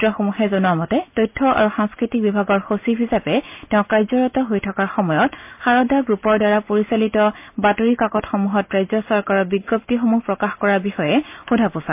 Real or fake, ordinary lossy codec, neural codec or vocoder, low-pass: real; MP3, 32 kbps; none; 3.6 kHz